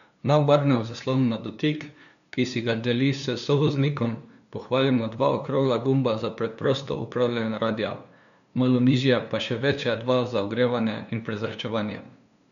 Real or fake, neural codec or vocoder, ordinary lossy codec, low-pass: fake; codec, 16 kHz, 2 kbps, FunCodec, trained on LibriTTS, 25 frames a second; none; 7.2 kHz